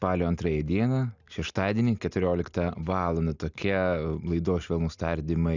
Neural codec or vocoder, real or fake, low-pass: none; real; 7.2 kHz